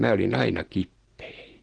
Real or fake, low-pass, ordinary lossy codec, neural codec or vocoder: real; 9.9 kHz; Opus, 16 kbps; none